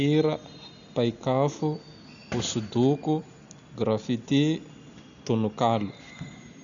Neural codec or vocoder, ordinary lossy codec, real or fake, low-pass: none; none; real; 7.2 kHz